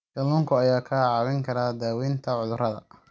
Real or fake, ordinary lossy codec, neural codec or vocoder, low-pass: real; none; none; none